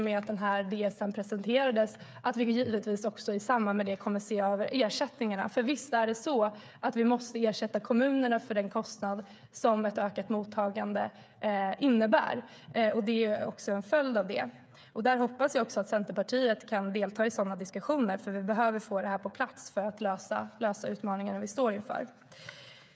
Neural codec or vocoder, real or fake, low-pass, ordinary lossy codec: codec, 16 kHz, 8 kbps, FreqCodec, smaller model; fake; none; none